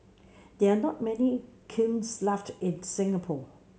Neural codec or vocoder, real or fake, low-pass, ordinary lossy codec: none; real; none; none